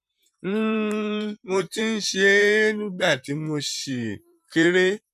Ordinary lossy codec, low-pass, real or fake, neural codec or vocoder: none; 14.4 kHz; fake; vocoder, 44.1 kHz, 128 mel bands, Pupu-Vocoder